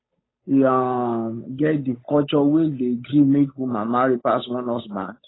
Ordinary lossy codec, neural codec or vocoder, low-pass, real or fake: AAC, 16 kbps; codec, 16 kHz, 8 kbps, FunCodec, trained on Chinese and English, 25 frames a second; 7.2 kHz; fake